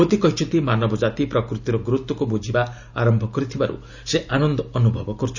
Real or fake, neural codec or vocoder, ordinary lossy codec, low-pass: real; none; none; 7.2 kHz